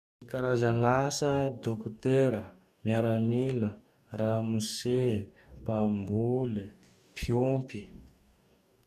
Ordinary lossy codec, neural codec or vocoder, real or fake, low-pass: none; codec, 44.1 kHz, 2.6 kbps, DAC; fake; 14.4 kHz